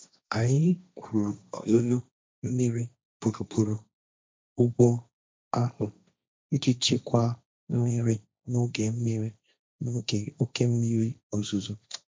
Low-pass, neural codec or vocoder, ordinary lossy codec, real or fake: none; codec, 16 kHz, 1.1 kbps, Voila-Tokenizer; none; fake